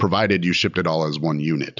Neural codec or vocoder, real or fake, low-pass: none; real; 7.2 kHz